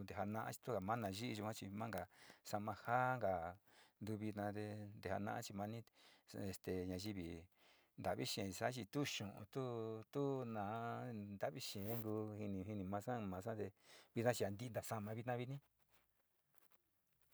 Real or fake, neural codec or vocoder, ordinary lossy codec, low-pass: real; none; none; none